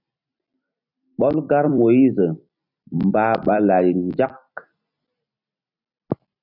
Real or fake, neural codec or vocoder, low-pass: fake; vocoder, 44.1 kHz, 128 mel bands every 256 samples, BigVGAN v2; 5.4 kHz